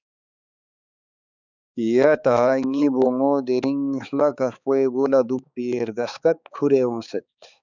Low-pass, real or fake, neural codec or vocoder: 7.2 kHz; fake; codec, 16 kHz, 4 kbps, X-Codec, HuBERT features, trained on balanced general audio